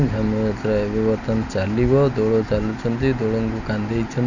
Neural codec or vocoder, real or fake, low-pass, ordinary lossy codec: none; real; 7.2 kHz; none